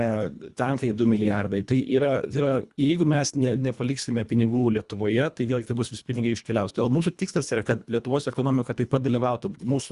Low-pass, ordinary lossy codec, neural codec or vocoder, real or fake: 10.8 kHz; Opus, 64 kbps; codec, 24 kHz, 1.5 kbps, HILCodec; fake